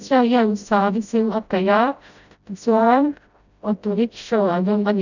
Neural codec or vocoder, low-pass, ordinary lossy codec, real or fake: codec, 16 kHz, 0.5 kbps, FreqCodec, smaller model; 7.2 kHz; none; fake